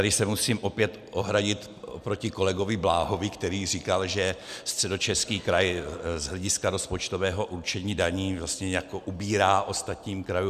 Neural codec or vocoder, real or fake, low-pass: none; real; 14.4 kHz